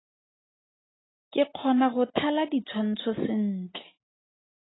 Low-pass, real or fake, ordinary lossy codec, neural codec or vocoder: 7.2 kHz; real; AAC, 16 kbps; none